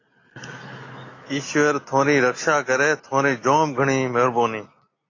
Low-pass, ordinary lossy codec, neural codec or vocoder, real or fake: 7.2 kHz; AAC, 32 kbps; none; real